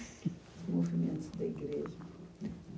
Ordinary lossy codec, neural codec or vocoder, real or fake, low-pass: none; none; real; none